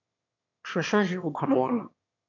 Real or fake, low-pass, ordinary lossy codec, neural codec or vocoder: fake; 7.2 kHz; AAC, 48 kbps; autoencoder, 22.05 kHz, a latent of 192 numbers a frame, VITS, trained on one speaker